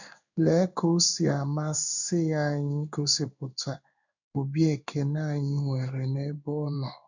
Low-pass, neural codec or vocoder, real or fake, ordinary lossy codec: 7.2 kHz; codec, 16 kHz in and 24 kHz out, 1 kbps, XY-Tokenizer; fake; none